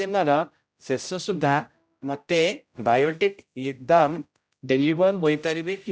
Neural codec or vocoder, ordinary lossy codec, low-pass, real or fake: codec, 16 kHz, 0.5 kbps, X-Codec, HuBERT features, trained on general audio; none; none; fake